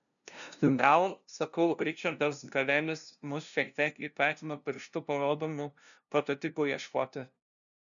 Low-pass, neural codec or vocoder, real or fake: 7.2 kHz; codec, 16 kHz, 0.5 kbps, FunCodec, trained on LibriTTS, 25 frames a second; fake